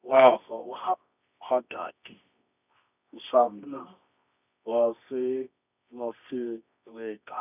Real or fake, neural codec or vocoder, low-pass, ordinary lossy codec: fake; codec, 24 kHz, 0.9 kbps, WavTokenizer, medium music audio release; 3.6 kHz; none